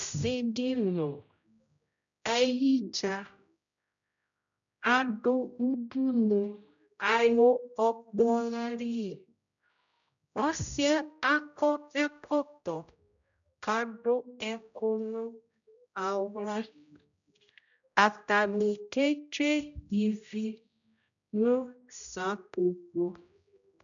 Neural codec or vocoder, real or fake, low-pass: codec, 16 kHz, 0.5 kbps, X-Codec, HuBERT features, trained on general audio; fake; 7.2 kHz